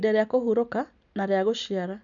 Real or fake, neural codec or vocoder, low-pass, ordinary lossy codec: real; none; 7.2 kHz; none